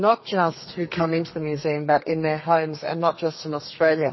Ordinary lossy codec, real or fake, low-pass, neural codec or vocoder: MP3, 24 kbps; fake; 7.2 kHz; codec, 32 kHz, 1.9 kbps, SNAC